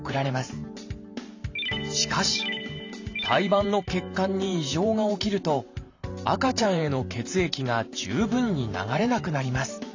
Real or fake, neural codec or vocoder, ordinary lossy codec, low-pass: fake; vocoder, 44.1 kHz, 128 mel bands every 512 samples, BigVGAN v2; AAC, 48 kbps; 7.2 kHz